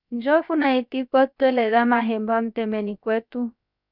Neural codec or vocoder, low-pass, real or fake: codec, 16 kHz, about 1 kbps, DyCAST, with the encoder's durations; 5.4 kHz; fake